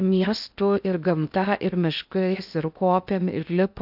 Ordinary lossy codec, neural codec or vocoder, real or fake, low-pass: AAC, 48 kbps; codec, 16 kHz in and 24 kHz out, 0.6 kbps, FocalCodec, streaming, 2048 codes; fake; 5.4 kHz